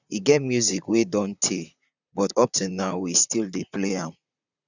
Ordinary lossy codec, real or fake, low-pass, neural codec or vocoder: none; fake; 7.2 kHz; vocoder, 44.1 kHz, 128 mel bands, Pupu-Vocoder